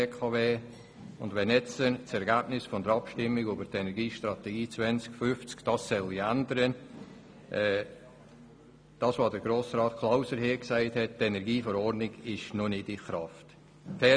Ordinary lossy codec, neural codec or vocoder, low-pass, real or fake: none; none; none; real